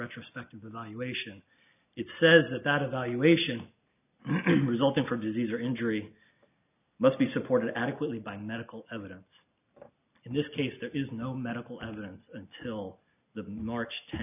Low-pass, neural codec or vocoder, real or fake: 3.6 kHz; none; real